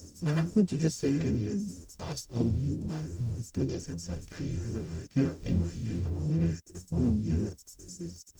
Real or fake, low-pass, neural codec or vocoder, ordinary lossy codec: fake; 19.8 kHz; codec, 44.1 kHz, 0.9 kbps, DAC; none